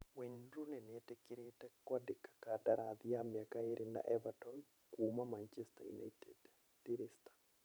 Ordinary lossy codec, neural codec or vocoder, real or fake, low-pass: none; vocoder, 44.1 kHz, 128 mel bands every 512 samples, BigVGAN v2; fake; none